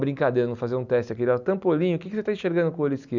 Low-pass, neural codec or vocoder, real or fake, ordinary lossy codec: 7.2 kHz; none; real; none